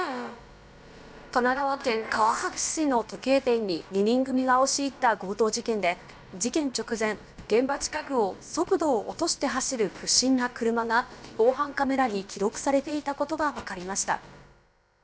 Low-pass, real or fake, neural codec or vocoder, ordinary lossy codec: none; fake; codec, 16 kHz, about 1 kbps, DyCAST, with the encoder's durations; none